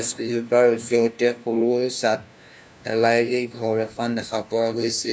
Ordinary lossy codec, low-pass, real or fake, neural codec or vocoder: none; none; fake; codec, 16 kHz, 1 kbps, FunCodec, trained on LibriTTS, 50 frames a second